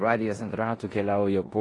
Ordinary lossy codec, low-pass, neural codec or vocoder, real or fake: AAC, 32 kbps; 10.8 kHz; codec, 16 kHz in and 24 kHz out, 0.9 kbps, LongCat-Audio-Codec, four codebook decoder; fake